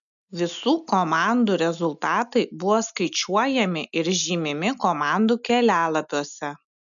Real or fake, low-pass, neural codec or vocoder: real; 7.2 kHz; none